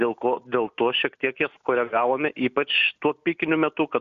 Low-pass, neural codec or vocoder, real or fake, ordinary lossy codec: 7.2 kHz; none; real; Opus, 64 kbps